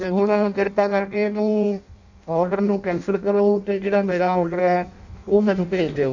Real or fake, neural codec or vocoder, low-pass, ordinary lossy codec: fake; codec, 16 kHz in and 24 kHz out, 0.6 kbps, FireRedTTS-2 codec; 7.2 kHz; none